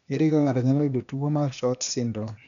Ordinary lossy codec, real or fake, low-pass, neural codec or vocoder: none; fake; 7.2 kHz; codec, 16 kHz, 0.8 kbps, ZipCodec